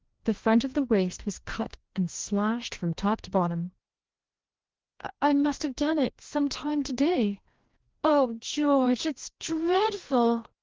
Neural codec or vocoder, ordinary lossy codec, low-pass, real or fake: codec, 16 kHz, 1 kbps, FreqCodec, larger model; Opus, 16 kbps; 7.2 kHz; fake